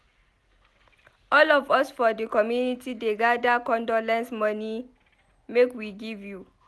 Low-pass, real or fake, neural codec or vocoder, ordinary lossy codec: none; real; none; none